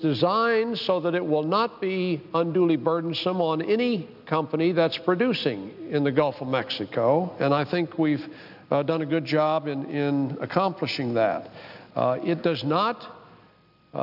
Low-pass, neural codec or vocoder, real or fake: 5.4 kHz; none; real